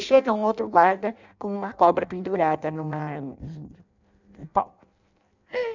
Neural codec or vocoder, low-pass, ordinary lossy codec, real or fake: codec, 16 kHz in and 24 kHz out, 0.6 kbps, FireRedTTS-2 codec; 7.2 kHz; none; fake